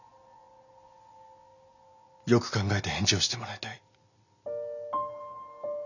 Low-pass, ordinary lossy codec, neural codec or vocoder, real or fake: 7.2 kHz; none; none; real